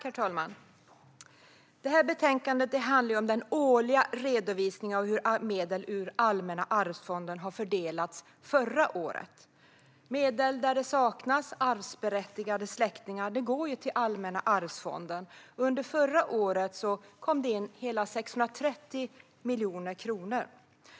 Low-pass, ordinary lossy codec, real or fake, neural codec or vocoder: none; none; real; none